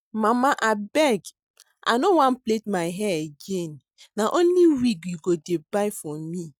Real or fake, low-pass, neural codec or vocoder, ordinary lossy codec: real; 19.8 kHz; none; none